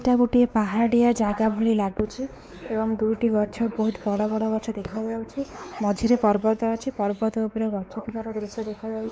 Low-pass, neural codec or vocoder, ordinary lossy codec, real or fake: none; codec, 16 kHz, 4 kbps, X-Codec, WavLM features, trained on Multilingual LibriSpeech; none; fake